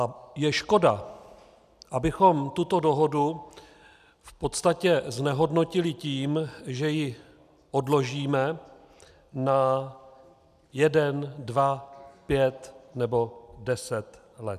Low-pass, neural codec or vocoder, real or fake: 14.4 kHz; none; real